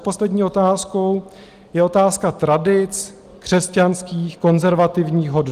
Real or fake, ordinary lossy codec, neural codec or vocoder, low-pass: real; Opus, 24 kbps; none; 14.4 kHz